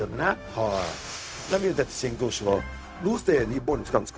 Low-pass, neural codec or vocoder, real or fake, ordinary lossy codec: none; codec, 16 kHz, 0.4 kbps, LongCat-Audio-Codec; fake; none